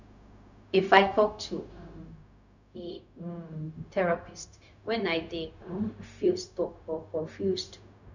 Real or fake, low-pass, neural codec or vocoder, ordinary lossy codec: fake; 7.2 kHz; codec, 16 kHz, 0.4 kbps, LongCat-Audio-Codec; none